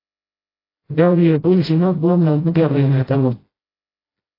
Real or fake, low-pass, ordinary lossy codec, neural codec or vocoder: fake; 5.4 kHz; AAC, 24 kbps; codec, 16 kHz, 0.5 kbps, FreqCodec, smaller model